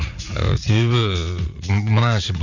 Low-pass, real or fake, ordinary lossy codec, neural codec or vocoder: 7.2 kHz; fake; none; vocoder, 44.1 kHz, 80 mel bands, Vocos